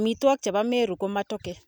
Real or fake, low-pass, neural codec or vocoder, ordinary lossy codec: real; none; none; none